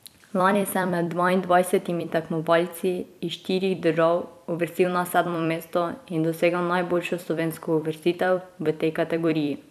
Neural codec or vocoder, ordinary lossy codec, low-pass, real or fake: vocoder, 44.1 kHz, 128 mel bands every 256 samples, BigVGAN v2; none; 14.4 kHz; fake